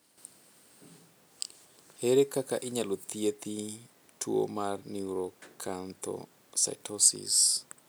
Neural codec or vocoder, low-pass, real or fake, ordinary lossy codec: none; none; real; none